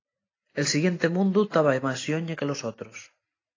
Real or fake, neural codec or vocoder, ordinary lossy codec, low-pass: real; none; AAC, 32 kbps; 7.2 kHz